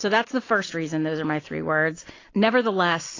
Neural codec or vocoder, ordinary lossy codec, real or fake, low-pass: none; AAC, 32 kbps; real; 7.2 kHz